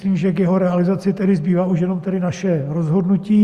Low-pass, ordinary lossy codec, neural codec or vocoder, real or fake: 14.4 kHz; Opus, 64 kbps; none; real